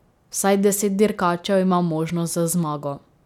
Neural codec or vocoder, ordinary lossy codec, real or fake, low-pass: none; none; real; 19.8 kHz